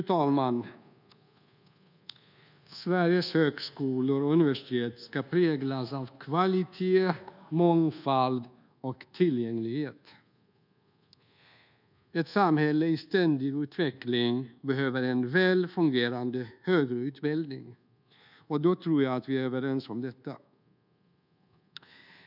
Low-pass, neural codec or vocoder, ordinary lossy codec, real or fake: 5.4 kHz; codec, 24 kHz, 1.2 kbps, DualCodec; none; fake